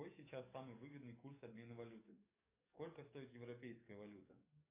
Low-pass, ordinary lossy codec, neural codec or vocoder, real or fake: 3.6 kHz; AAC, 32 kbps; codec, 44.1 kHz, 7.8 kbps, DAC; fake